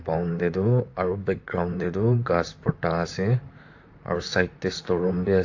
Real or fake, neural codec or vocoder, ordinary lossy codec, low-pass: fake; vocoder, 44.1 kHz, 128 mel bands, Pupu-Vocoder; AAC, 48 kbps; 7.2 kHz